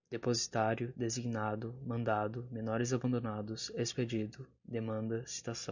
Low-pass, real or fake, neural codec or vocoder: 7.2 kHz; real; none